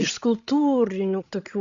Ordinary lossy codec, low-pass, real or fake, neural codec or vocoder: Opus, 64 kbps; 7.2 kHz; fake; codec, 16 kHz, 16 kbps, FreqCodec, larger model